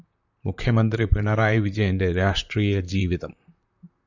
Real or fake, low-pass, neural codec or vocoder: fake; 7.2 kHz; vocoder, 44.1 kHz, 128 mel bands, Pupu-Vocoder